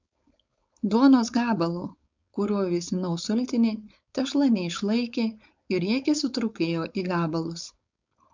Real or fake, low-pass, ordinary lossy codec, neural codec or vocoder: fake; 7.2 kHz; MP3, 64 kbps; codec, 16 kHz, 4.8 kbps, FACodec